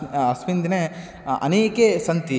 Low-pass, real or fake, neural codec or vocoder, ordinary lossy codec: none; real; none; none